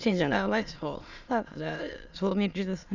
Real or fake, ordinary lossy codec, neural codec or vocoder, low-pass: fake; none; autoencoder, 22.05 kHz, a latent of 192 numbers a frame, VITS, trained on many speakers; 7.2 kHz